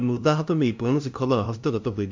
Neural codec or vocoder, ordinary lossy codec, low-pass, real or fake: codec, 16 kHz, 0.5 kbps, FunCodec, trained on LibriTTS, 25 frames a second; MP3, 64 kbps; 7.2 kHz; fake